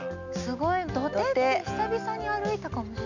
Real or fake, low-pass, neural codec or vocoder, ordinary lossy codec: real; 7.2 kHz; none; none